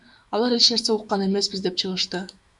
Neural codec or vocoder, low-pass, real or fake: autoencoder, 48 kHz, 128 numbers a frame, DAC-VAE, trained on Japanese speech; 10.8 kHz; fake